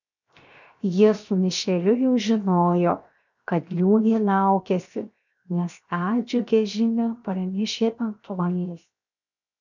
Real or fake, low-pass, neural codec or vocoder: fake; 7.2 kHz; codec, 16 kHz, 0.7 kbps, FocalCodec